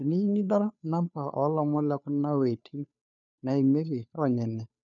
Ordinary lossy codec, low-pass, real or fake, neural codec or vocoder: none; 7.2 kHz; fake; codec, 16 kHz, 2 kbps, FunCodec, trained on Chinese and English, 25 frames a second